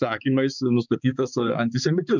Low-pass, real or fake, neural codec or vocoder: 7.2 kHz; fake; codec, 16 kHz, 4 kbps, X-Codec, HuBERT features, trained on balanced general audio